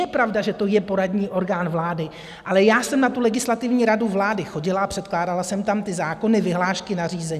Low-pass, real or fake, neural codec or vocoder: 14.4 kHz; real; none